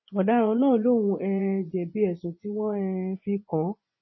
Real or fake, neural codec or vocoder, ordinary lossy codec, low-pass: fake; vocoder, 24 kHz, 100 mel bands, Vocos; MP3, 24 kbps; 7.2 kHz